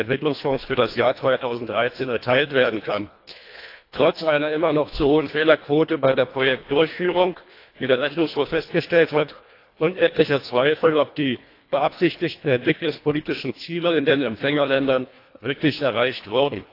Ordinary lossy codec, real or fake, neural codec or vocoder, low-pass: AAC, 32 kbps; fake; codec, 24 kHz, 1.5 kbps, HILCodec; 5.4 kHz